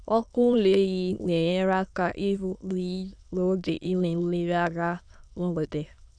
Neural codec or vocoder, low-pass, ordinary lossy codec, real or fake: autoencoder, 22.05 kHz, a latent of 192 numbers a frame, VITS, trained on many speakers; 9.9 kHz; none; fake